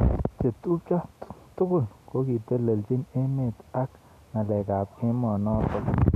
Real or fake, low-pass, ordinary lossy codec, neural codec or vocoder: real; 14.4 kHz; none; none